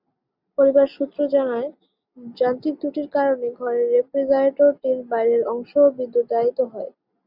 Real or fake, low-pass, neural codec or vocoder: real; 5.4 kHz; none